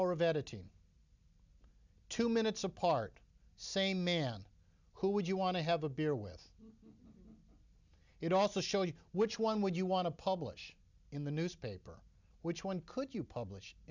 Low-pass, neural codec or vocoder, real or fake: 7.2 kHz; none; real